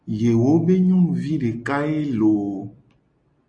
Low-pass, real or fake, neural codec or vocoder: 9.9 kHz; real; none